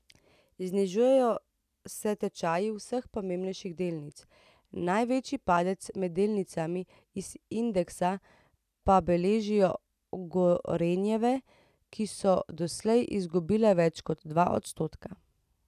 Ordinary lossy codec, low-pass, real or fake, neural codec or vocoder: none; 14.4 kHz; real; none